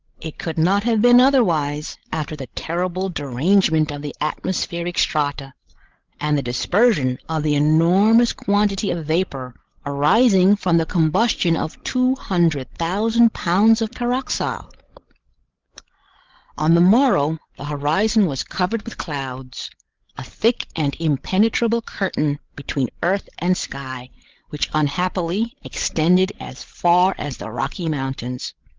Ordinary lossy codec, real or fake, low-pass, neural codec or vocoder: Opus, 16 kbps; fake; 7.2 kHz; codec, 16 kHz, 16 kbps, FreqCodec, larger model